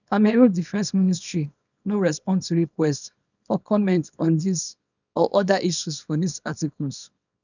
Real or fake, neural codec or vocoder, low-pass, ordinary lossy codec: fake; codec, 24 kHz, 0.9 kbps, WavTokenizer, small release; 7.2 kHz; none